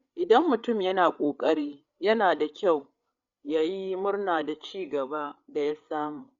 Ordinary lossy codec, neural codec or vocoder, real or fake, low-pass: Opus, 64 kbps; codec, 16 kHz, 8 kbps, FreqCodec, larger model; fake; 7.2 kHz